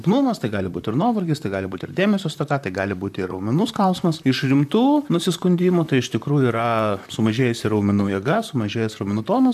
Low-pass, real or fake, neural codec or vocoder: 14.4 kHz; fake; vocoder, 44.1 kHz, 128 mel bands, Pupu-Vocoder